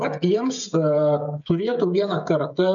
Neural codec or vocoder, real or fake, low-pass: codec, 16 kHz, 16 kbps, FreqCodec, smaller model; fake; 7.2 kHz